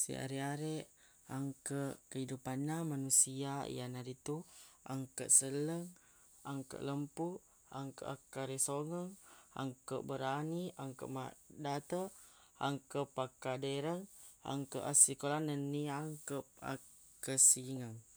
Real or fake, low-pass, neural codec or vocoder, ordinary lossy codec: real; none; none; none